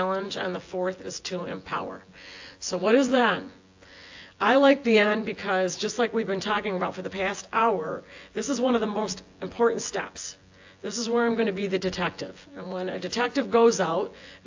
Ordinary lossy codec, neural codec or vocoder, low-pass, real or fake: AAC, 48 kbps; vocoder, 24 kHz, 100 mel bands, Vocos; 7.2 kHz; fake